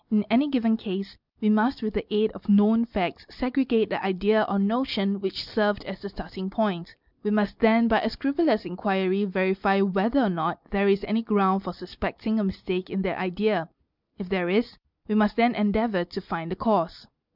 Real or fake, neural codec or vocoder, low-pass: real; none; 5.4 kHz